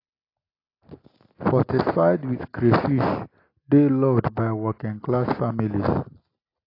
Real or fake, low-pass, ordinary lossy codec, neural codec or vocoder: real; 5.4 kHz; AAC, 32 kbps; none